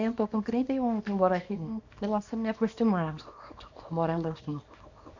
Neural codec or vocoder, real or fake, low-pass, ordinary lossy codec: codec, 24 kHz, 0.9 kbps, WavTokenizer, small release; fake; 7.2 kHz; AAC, 48 kbps